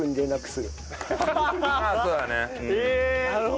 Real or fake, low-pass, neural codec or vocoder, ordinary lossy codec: real; none; none; none